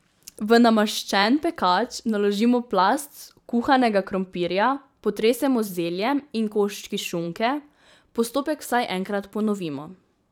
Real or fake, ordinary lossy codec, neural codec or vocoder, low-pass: fake; none; vocoder, 44.1 kHz, 128 mel bands every 512 samples, BigVGAN v2; 19.8 kHz